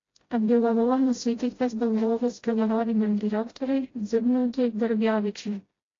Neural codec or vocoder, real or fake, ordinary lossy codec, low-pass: codec, 16 kHz, 0.5 kbps, FreqCodec, smaller model; fake; AAC, 32 kbps; 7.2 kHz